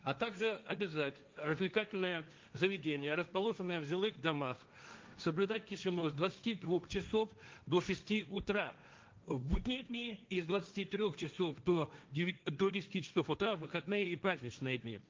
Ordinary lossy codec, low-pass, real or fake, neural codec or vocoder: Opus, 32 kbps; 7.2 kHz; fake; codec, 16 kHz, 1.1 kbps, Voila-Tokenizer